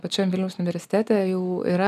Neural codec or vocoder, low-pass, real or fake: none; 14.4 kHz; real